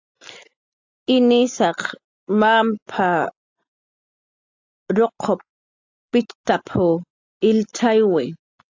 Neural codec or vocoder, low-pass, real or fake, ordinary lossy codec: none; 7.2 kHz; real; AAC, 48 kbps